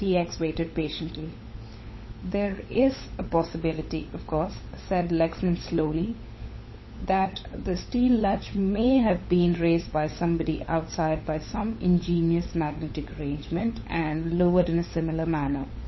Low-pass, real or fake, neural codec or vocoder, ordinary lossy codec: 7.2 kHz; fake; codec, 16 kHz, 16 kbps, FunCodec, trained on LibriTTS, 50 frames a second; MP3, 24 kbps